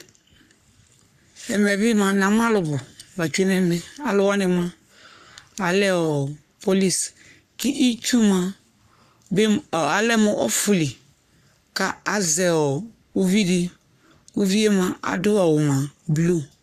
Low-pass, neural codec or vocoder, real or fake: 14.4 kHz; codec, 44.1 kHz, 3.4 kbps, Pupu-Codec; fake